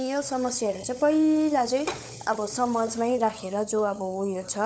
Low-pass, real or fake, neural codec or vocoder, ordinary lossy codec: none; fake; codec, 16 kHz, 16 kbps, FunCodec, trained on LibriTTS, 50 frames a second; none